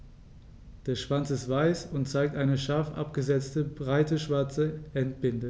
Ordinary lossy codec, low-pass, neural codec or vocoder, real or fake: none; none; none; real